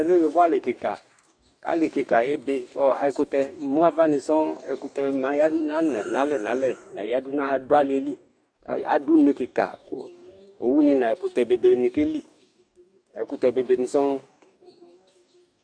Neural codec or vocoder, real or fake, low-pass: codec, 44.1 kHz, 2.6 kbps, DAC; fake; 9.9 kHz